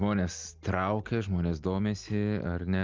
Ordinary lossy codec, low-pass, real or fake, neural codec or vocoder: Opus, 32 kbps; 7.2 kHz; real; none